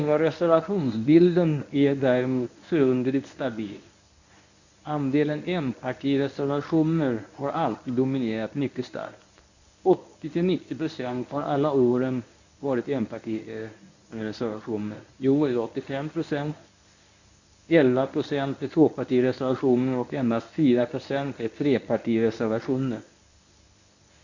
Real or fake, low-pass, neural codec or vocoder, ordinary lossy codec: fake; 7.2 kHz; codec, 24 kHz, 0.9 kbps, WavTokenizer, medium speech release version 1; none